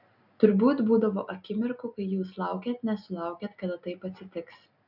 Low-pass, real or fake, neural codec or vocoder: 5.4 kHz; real; none